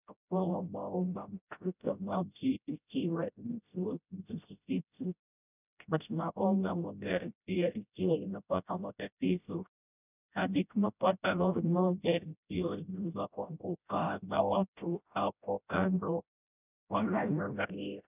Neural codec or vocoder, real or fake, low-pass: codec, 16 kHz, 0.5 kbps, FreqCodec, smaller model; fake; 3.6 kHz